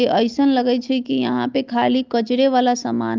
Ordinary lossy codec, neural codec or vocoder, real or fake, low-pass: Opus, 24 kbps; none; real; 7.2 kHz